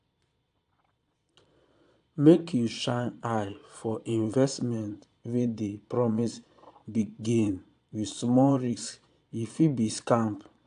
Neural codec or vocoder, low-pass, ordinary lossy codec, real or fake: vocoder, 22.05 kHz, 80 mel bands, Vocos; 9.9 kHz; AAC, 64 kbps; fake